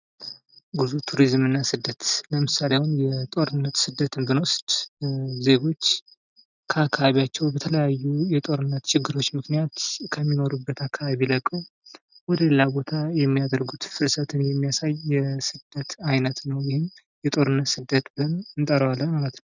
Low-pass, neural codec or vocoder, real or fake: 7.2 kHz; none; real